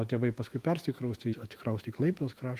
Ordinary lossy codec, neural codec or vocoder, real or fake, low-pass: Opus, 24 kbps; autoencoder, 48 kHz, 128 numbers a frame, DAC-VAE, trained on Japanese speech; fake; 14.4 kHz